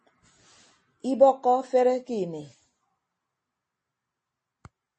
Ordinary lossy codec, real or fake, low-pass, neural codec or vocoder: MP3, 32 kbps; real; 10.8 kHz; none